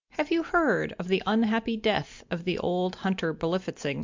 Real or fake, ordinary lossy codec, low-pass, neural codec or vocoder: real; MP3, 64 kbps; 7.2 kHz; none